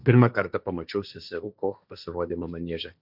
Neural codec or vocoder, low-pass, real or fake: codec, 16 kHz, 1.1 kbps, Voila-Tokenizer; 5.4 kHz; fake